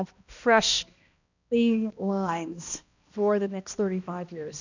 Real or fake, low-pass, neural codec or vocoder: fake; 7.2 kHz; codec, 16 kHz, 1 kbps, X-Codec, HuBERT features, trained on balanced general audio